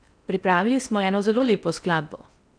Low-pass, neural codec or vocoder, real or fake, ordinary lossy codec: 9.9 kHz; codec, 16 kHz in and 24 kHz out, 0.6 kbps, FocalCodec, streaming, 4096 codes; fake; none